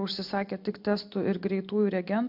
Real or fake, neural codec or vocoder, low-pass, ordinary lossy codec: real; none; 5.4 kHz; MP3, 48 kbps